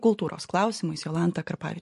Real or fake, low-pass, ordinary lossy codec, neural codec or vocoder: real; 14.4 kHz; MP3, 48 kbps; none